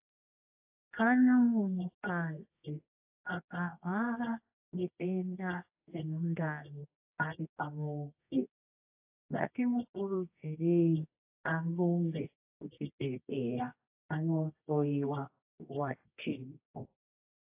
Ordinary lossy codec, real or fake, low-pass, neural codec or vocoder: AAC, 32 kbps; fake; 3.6 kHz; codec, 24 kHz, 0.9 kbps, WavTokenizer, medium music audio release